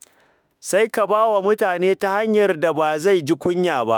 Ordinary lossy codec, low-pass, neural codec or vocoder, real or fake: none; none; autoencoder, 48 kHz, 32 numbers a frame, DAC-VAE, trained on Japanese speech; fake